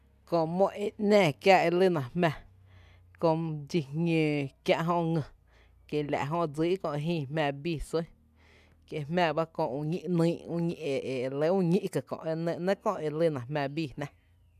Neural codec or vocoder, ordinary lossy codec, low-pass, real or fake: none; none; 14.4 kHz; real